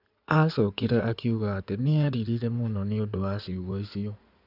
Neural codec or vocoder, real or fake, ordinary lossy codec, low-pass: codec, 16 kHz in and 24 kHz out, 2.2 kbps, FireRedTTS-2 codec; fake; none; 5.4 kHz